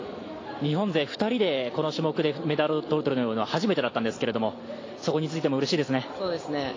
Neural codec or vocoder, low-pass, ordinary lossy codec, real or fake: none; 7.2 kHz; AAC, 32 kbps; real